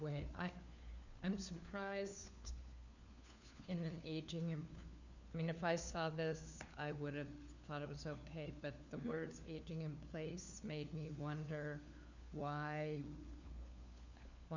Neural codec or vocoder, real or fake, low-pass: codec, 16 kHz, 4 kbps, FunCodec, trained on LibriTTS, 50 frames a second; fake; 7.2 kHz